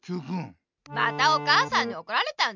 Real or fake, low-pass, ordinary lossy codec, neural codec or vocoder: real; 7.2 kHz; none; none